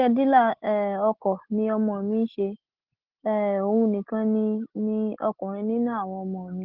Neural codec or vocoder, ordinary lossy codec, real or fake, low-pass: none; Opus, 16 kbps; real; 5.4 kHz